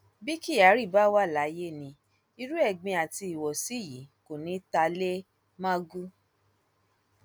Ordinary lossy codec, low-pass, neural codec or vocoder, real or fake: none; none; none; real